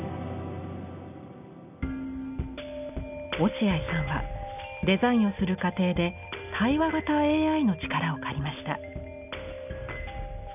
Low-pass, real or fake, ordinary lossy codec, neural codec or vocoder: 3.6 kHz; real; none; none